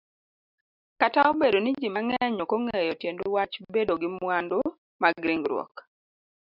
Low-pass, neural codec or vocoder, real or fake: 5.4 kHz; none; real